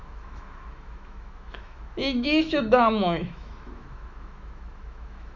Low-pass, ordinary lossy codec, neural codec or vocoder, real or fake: 7.2 kHz; none; autoencoder, 48 kHz, 128 numbers a frame, DAC-VAE, trained on Japanese speech; fake